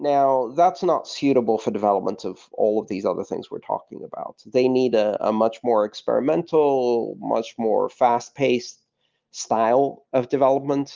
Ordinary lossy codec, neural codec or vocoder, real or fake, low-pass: Opus, 32 kbps; none; real; 7.2 kHz